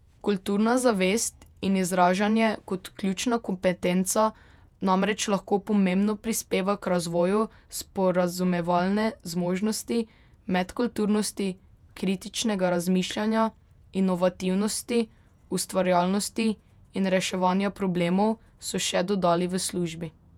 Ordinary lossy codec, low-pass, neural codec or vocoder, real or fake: none; 19.8 kHz; vocoder, 48 kHz, 128 mel bands, Vocos; fake